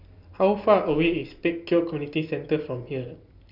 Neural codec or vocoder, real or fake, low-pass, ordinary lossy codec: none; real; 5.4 kHz; none